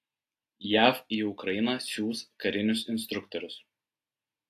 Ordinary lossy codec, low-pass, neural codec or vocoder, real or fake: AAC, 64 kbps; 14.4 kHz; none; real